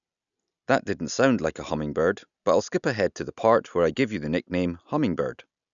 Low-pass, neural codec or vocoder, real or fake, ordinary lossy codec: 7.2 kHz; none; real; none